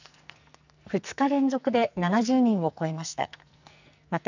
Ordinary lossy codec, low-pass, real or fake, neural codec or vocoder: none; 7.2 kHz; fake; codec, 44.1 kHz, 2.6 kbps, SNAC